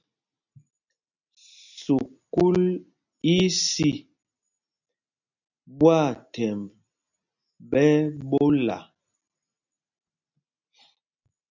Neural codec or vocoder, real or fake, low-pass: none; real; 7.2 kHz